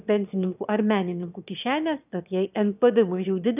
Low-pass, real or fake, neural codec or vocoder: 3.6 kHz; fake; autoencoder, 22.05 kHz, a latent of 192 numbers a frame, VITS, trained on one speaker